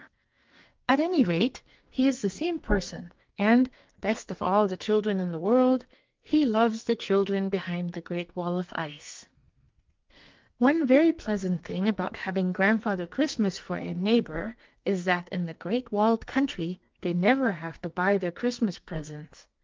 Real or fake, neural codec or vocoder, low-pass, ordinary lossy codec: fake; codec, 44.1 kHz, 2.6 kbps, SNAC; 7.2 kHz; Opus, 32 kbps